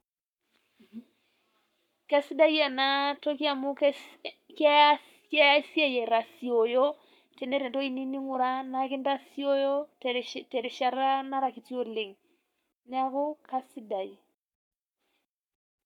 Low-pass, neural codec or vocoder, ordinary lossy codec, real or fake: 19.8 kHz; codec, 44.1 kHz, 7.8 kbps, Pupu-Codec; none; fake